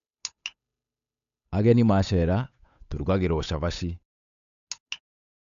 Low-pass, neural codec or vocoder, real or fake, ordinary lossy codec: 7.2 kHz; codec, 16 kHz, 8 kbps, FunCodec, trained on Chinese and English, 25 frames a second; fake; AAC, 96 kbps